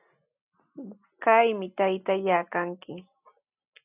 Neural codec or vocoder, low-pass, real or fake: none; 3.6 kHz; real